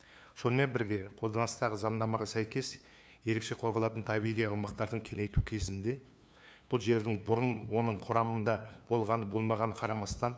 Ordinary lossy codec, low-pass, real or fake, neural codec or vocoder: none; none; fake; codec, 16 kHz, 2 kbps, FunCodec, trained on LibriTTS, 25 frames a second